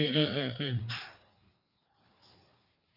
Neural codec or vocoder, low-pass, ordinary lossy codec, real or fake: codec, 16 kHz, 4 kbps, FreqCodec, larger model; 5.4 kHz; none; fake